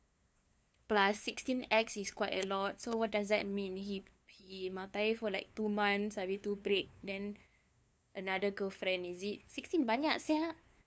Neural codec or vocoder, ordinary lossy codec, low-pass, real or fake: codec, 16 kHz, 2 kbps, FunCodec, trained on LibriTTS, 25 frames a second; none; none; fake